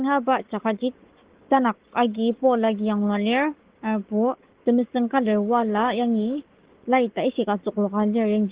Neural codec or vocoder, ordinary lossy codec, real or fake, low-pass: codec, 44.1 kHz, 7.8 kbps, Pupu-Codec; Opus, 16 kbps; fake; 3.6 kHz